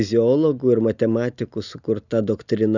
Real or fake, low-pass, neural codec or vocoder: real; 7.2 kHz; none